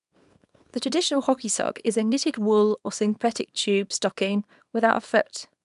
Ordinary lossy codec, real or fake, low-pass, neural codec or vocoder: none; fake; 10.8 kHz; codec, 24 kHz, 0.9 kbps, WavTokenizer, small release